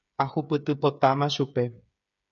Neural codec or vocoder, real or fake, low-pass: codec, 16 kHz, 8 kbps, FreqCodec, smaller model; fake; 7.2 kHz